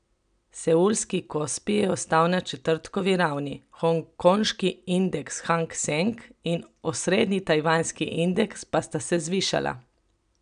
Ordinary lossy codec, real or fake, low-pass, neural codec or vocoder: none; real; 9.9 kHz; none